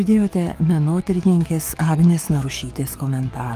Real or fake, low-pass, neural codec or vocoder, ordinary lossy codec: fake; 14.4 kHz; codec, 44.1 kHz, 7.8 kbps, DAC; Opus, 32 kbps